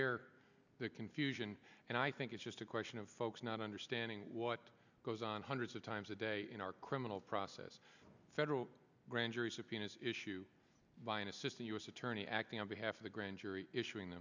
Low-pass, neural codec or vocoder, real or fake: 7.2 kHz; none; real